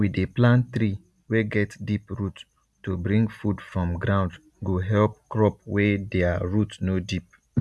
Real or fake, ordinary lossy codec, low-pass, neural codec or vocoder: real; none; none; none